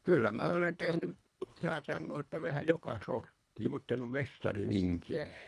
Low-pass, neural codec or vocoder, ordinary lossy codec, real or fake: none; codec, 24 kHz, 1.5 kbps, HILCodec; none; fake